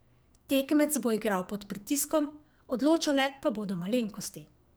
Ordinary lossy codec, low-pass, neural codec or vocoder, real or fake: none; none; codec, 44.1 kHz, 2.6 kbps, SNAC; fake